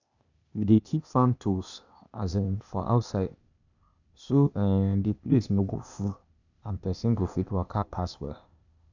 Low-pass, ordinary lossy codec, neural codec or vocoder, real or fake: 7.2 kHz; none; codec, 16 kHz, 0.8 kbps, ZipCodec; fake